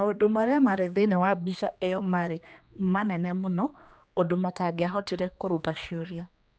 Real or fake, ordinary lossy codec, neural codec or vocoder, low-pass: fake; none; codec, 16 kHz, 2 kbps, X-Codec, HuBERT features, trained on general audio; none